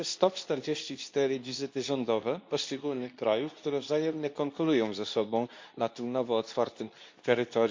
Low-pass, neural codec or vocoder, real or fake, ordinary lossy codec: 7.2 kHz; codec, 24 kHz, 0.9 kbps, WavTokenizer, medium speech release version 2; fake; MP3, 64 kbps